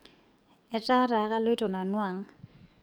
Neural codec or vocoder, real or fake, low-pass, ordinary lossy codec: codec, 44.1 kHz, 7.8 kbps, DAC; fake; none; none